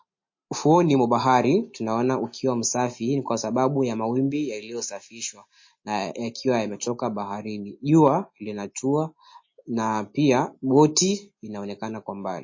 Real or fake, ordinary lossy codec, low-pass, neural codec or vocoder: real; MP3, 32 kbps; 7.2 kHz; none